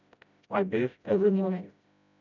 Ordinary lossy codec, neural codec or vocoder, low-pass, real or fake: none; codec, 16 kHz, 0.5 kbps, FreqCodec, smaller model; 7.2 kHz; fake